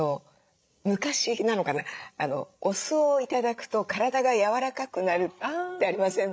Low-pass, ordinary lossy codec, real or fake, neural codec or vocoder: none; none; fake; codec, 16 kHz, 16 kbps, FreqCodec, larger model